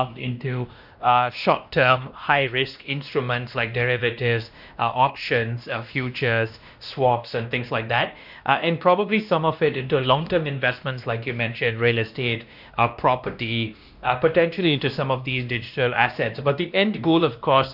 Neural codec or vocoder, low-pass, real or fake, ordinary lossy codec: codec, 16 kHz, 1 kbps, X-Codec, WavLM features, trained on Multilingual LibriSpeech; 5.4 kHz; fake; none